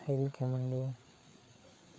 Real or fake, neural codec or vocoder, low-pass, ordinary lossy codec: fake; codec, 16 kHz, 4 kbps, FreqCodec, larger model; none; none